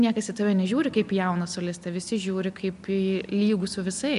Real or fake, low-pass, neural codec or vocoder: real; 10.8 kHz; none